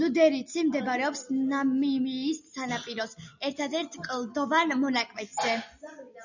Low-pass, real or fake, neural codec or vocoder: 7.2 kHz; real; none